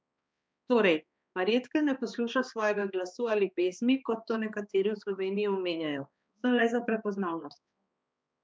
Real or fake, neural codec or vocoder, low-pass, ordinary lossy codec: fake; codec, 16 kHz, 2 kbps, X-Codec, HuBERT features, trained on balanced general audio; none; none